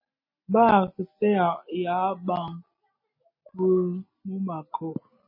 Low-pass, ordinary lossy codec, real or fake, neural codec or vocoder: 5.4 kHz; MP3, 24 kbps; real; none